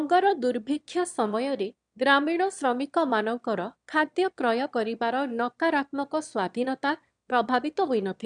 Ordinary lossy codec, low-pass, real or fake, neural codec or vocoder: none; 9.9 kHz; fake; autoencoder, 22.05 kHz, a latent of 192 numbers a frame, VITS, trained on one speaker